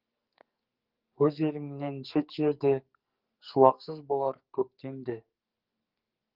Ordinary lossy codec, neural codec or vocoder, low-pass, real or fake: Opus, 32 kbps; codec, 44.1 kHz, 2.6 kbps, SNAC; 5.4 kHz; fake